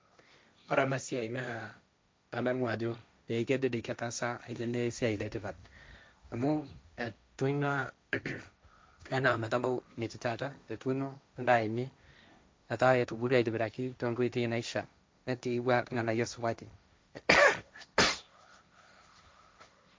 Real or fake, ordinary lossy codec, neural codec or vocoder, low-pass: fake; none; codec, 16 kHz, 1.1 kbps, Voila-Tokenizer; none